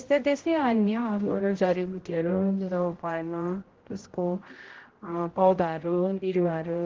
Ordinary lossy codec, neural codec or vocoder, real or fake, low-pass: Opus, 16 kbps; codec, 16 kHz, 0.5 kbps, X-Codec, HuBERT features, trained on general audio; fake; 7.2 kHz